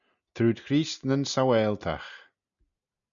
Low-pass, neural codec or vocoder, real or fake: 7.2 kHz; none; real